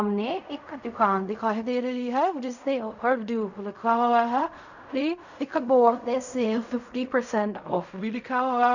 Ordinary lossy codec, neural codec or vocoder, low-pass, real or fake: none; codec, 16 kHz in and 24 kHz out, 0.4 kbps, LongCat-Audio-Codec, fine tuned four codebook decoder; 7.2 kHz; fake